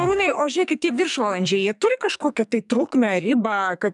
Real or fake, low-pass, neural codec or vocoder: fake; 10.8 kHz; codec, 44.1 kHz, 2.6 kbps, SNAC